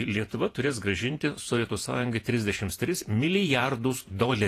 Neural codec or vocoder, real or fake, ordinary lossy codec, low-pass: vocoder, 48 kHz, 128 mel bands, Vocos; fake; AAC, 48 kbps; 14.4 kHz